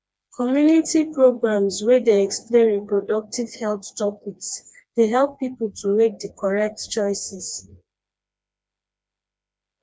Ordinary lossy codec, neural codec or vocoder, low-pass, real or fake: none; codec, 16 kHz, 2 kbps, FreqCodec, smaller model; none; fake